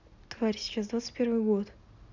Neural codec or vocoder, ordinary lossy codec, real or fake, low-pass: none; none; real; 7.2 kHz